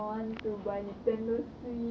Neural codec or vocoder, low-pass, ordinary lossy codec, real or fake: none; none; none; real